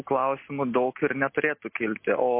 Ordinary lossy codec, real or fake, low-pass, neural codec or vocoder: MP3, 24 kbps; real; 3.6 kHz; none